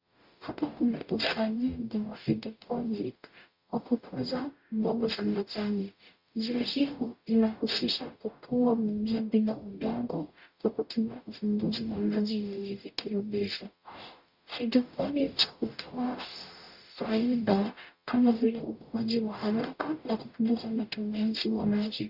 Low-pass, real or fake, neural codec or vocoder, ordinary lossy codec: 5.4 kHz; fake; codec, 44.1 kHz, 0.9 kbps, DAC; Opus, 64 kbps